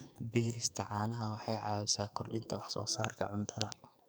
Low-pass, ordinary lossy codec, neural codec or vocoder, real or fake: none; none; codec, 44.1 kHz, 2.6 kbps, SNAC; fake